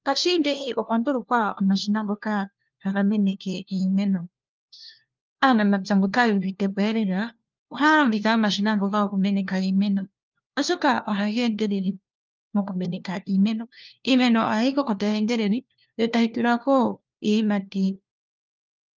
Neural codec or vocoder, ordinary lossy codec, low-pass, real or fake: codec, 16 kHz, 1 kbps, FunCodec, trained on LibriTTS, 50 frames a second; Opus, 24 kbps; 7.2 kHz; fake